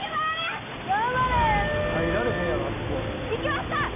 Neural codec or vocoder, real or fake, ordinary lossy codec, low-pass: none; real; none; 3.6 kHz